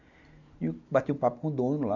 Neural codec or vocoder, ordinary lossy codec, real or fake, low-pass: none; none; real; 7.2 kHz